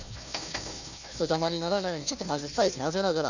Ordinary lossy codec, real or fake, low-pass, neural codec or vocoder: none; fake; 7.2 kHz; codec, 16 kHz, 1 kbps, FunCodec, trained on Chinese and English, 50 frames a second